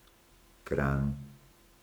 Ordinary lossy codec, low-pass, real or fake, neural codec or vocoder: none; none; fake; codec, 44.1 kHz, 7.8 kbps, Pupu-Codec